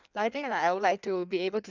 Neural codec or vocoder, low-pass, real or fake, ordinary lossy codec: codec, 16 kHz in and 24 kHz out, 1.1 kbps, FireRedTTS-2 codec; 7.2 kHz; fake; none